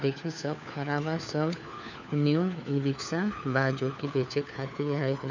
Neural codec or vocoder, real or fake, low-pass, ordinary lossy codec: codec, 16 kHz, 4 kbps, FunCodec, trained on LibriTTS, 50 frames a second; fake; 7.2 kHz; none